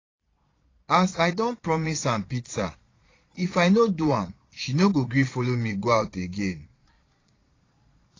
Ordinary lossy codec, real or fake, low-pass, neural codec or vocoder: AAC, 32 kbps; fake; 7.2 kHz; vocoder, 22.05 kHz, 80 mel bands, Vocos